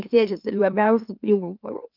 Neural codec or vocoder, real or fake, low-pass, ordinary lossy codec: autoencoder, 44.1 kHz, a latent of 192 numbers a frame, MeloTTS; fake; 5.4 kHz; Opus, 32 kbps